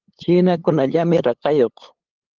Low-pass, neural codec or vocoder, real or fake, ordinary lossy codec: 7.2 kHz; codec, 16 kHz, 8 kbps, FunCodec, trained on LibriTTS, 25 frames a second; fake; Opus, 16 kbps